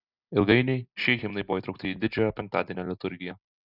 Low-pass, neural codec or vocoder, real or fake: 5.4 kHz; none; real